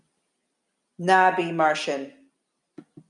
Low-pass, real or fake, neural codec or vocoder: 10.8 kHz; real; none